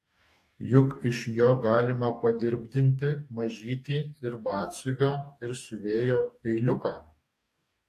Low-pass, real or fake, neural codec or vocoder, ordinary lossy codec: 14.4 kHz; fake; codec, 44.1 kHz, 2.6 kbps, DAC; AAC, 64 kbps